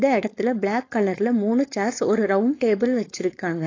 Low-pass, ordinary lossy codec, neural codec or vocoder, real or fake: 7.2 kHz; AAC, 32 kbps; codec, 16 kHz, 4.8 kbps, FACodec; fake